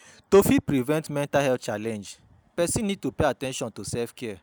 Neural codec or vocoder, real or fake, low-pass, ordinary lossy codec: none; real; none; none